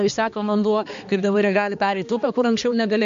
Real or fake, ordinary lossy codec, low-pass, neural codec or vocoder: fake; MP3, 48 kbps; 7.2 kHz; codec, 16 kHz, 2 kbps, X-Codec, HuBERT features, trained on balanced general audio